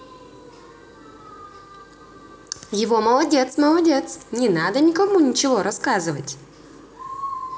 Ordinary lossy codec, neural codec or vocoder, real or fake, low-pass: none; none; real; none